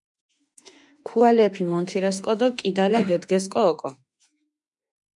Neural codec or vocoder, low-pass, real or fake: autoencoder, 48 kHz, 32 numbers a frame, DAC-VAE, trained on Japanese speech; 10.8 kHz; fake